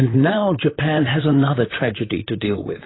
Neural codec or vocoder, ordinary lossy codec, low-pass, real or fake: vocoder, 44.1 kHz, 128 mel bands, Pupu-Vocoder; AAC, 16 kbps; 7.2 kHz; fake